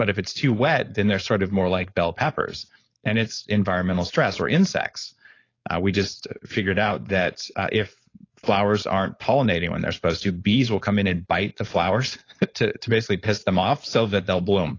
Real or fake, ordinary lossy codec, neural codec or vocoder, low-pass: fake; AAC, 32 kbps; codec, 16 kHz, 4.8 kbps, FACodec; 7.2 kHz